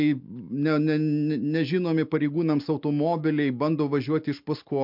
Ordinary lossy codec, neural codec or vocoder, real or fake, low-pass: MP3, 48 kbps; none; real; 5.4 kHz